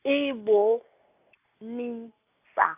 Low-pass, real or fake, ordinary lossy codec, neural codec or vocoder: 3.6 kHz; real; AAC, 32 kbps; none